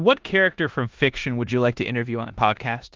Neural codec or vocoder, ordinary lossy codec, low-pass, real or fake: codec, 16 kHz in and 24 kHz out, 0.9 kbps, LongCat-Audio-Codec, four codebook decoder; Opus, 24 kbps; 7.2 kHz; fake